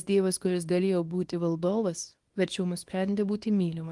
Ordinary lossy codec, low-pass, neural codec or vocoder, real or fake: Opus, 24 kbps; 10.8 kHz; codec, 24 kHz, 0.9 kbps, WavTokenizer, small release; fake